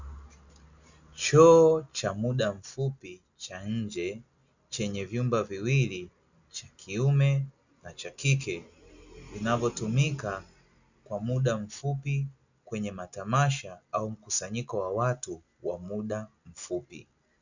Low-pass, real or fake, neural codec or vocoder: 7.2 kHz; real; none